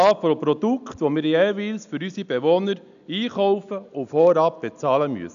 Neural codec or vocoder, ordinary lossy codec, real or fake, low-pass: none; none; real; 7.2 kHz